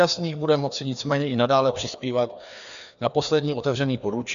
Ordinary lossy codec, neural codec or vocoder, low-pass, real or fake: AAC, 96 kbps; codec, 16 kHz, 2 kbps, FreqCodec, larger model; 7.2 kHz; fake